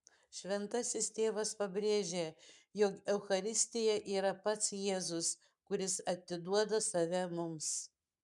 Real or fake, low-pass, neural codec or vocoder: fake; 10.8 kHz; codec, 44.1 kHz, 7.8 kbps, Pupu-Codec